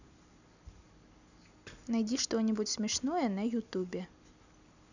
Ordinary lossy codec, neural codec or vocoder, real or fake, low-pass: none; none; real; 7.2 kHz